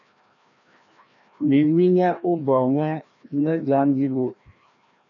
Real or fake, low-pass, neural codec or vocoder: fake; 7.2 kHz; codec, 16 kHz, 1 kbps, FreqCodec, larger model